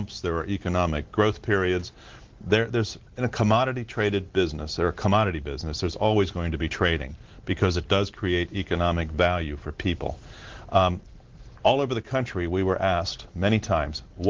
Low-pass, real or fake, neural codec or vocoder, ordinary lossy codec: 7.2 kHz; real; none; Opus, 16 kbps